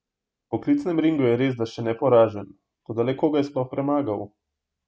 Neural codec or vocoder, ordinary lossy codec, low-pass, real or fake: none; none; none; real